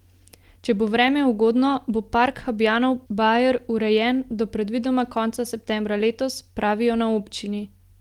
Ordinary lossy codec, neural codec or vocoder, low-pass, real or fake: Opus, 24 kbps; none; 19.8 kHz; real